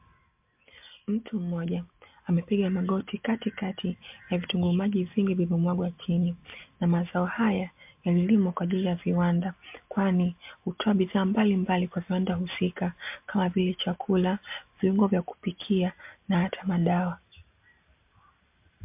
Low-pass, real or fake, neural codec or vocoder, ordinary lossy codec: 3.6 kHz; real; none; MP3, 32 kbps